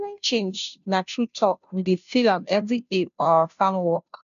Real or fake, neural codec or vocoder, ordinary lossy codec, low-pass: fake; codec, 16 kHz, 0.5 kbps, FunCodec, trained on Chinese and English, 25 frames a second; none; 7.2 kHz